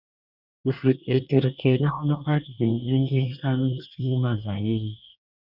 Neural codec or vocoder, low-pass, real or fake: codec, 24 kHz, 1 kbps, SNAC; 5.4 kHz; fake